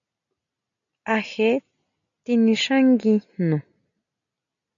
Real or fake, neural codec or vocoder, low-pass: real; none; 7.2 kHz